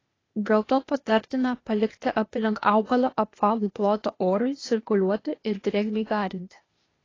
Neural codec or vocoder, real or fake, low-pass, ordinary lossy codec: codec, 16 kHz, 0.8 kbps, ZipCodec; fake; 7.2 kHz; AAC, 32 kbps